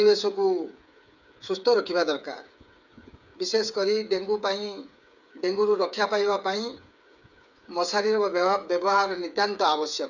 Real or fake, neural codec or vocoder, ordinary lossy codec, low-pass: fake; codec, 16 kHz, 8 kbps, FreqCodec, smaller model; none; 7.2 kHz